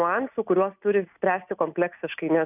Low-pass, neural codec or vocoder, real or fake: 3.6 kHz; none; real